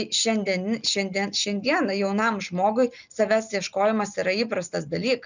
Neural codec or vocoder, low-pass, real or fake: none; 7.2 kHz; real